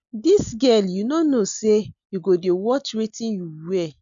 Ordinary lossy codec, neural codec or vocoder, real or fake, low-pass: none; none; real; 7.2 kHz